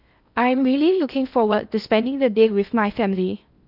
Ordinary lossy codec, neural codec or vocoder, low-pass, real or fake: none; codec, 16 kHz in and 24 kHz out, 0.6 kbps, FocalCodec, streaming, 2048 codes; 5.4 kHz; fake